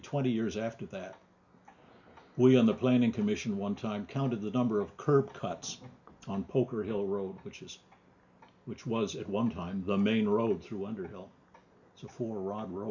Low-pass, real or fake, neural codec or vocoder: 7.2 kHz; real; none